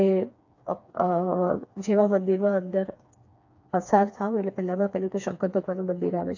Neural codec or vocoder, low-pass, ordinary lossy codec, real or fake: codec, 16 kHz, 4 kbps, FreqCodec, smaller model; 7.2 kHz; AAC, 48 kbps; fake